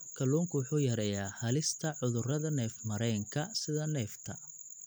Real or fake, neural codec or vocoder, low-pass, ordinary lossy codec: real; none; none; none